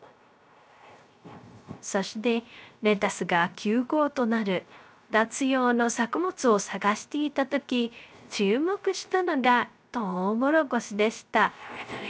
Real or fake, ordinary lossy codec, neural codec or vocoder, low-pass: fake; none; codec, 16 kHz, 0.3 kbps, FocalCodec; none